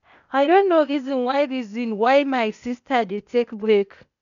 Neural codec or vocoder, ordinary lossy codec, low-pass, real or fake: codec, 16 kHz, 0.8 kbps, ZipCodec; none; 7.2 kHz; fake